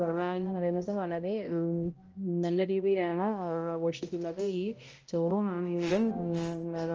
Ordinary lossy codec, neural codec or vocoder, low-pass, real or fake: Opus, 24 kbps; codec, 16 kHz, 0.5 kbps, X-Codec, HuBERT features, trained on balanced general audio; 7.2 kHz; fake